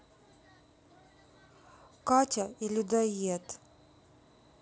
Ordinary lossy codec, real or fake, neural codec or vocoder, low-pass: none; real; none; none